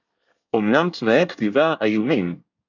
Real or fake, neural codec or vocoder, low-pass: fake; codec, 24 kHz, 1 kbps, SNAC; 7.2 kHz